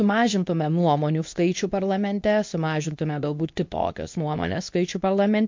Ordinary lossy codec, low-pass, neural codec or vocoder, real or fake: MP3, 48 kbps; 7.2 kHz; codec, 24 kHz, 0.9 kbps, WavTokenizer, medium speech release version 2; fake